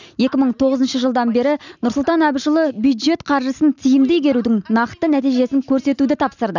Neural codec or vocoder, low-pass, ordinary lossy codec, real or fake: none; 7.2 kHz; none; real